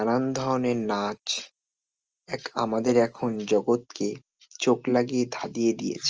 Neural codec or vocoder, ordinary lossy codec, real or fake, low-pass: none; Opus, 24 kbps; real; 7.2 kHz